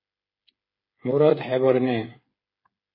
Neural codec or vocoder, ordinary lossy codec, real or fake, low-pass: codec, 16 kHz, 8 kbps, FreqCodec, smaller model; MP3, 24 kbps; fake; 5.4 kHz